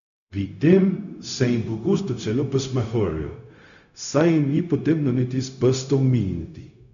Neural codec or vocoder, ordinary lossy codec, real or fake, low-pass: codec, 16 kHz, 0.4 kbps, LongCat-Audio-Codec; none; fake; 7.2 kHz